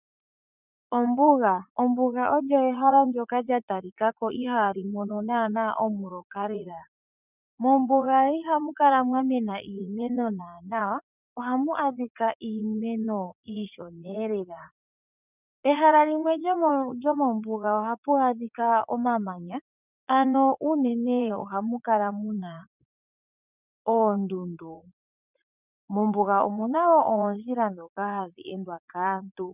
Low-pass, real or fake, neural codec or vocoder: 3.6 kHz; fake; vocoder, 44.1 kHz, 80 mel bands, Vocos